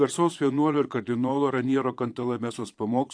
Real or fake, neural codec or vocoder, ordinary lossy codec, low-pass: fake; vocoder, 22.05 kHz, 80 mel bands, Vocos; AAC, 64 kbps; 9.9 kHz